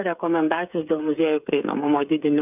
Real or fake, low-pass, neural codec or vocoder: fake; 3.6 kHz; codec, 16 kHz, 8 kbps, FreqCodec, smaller model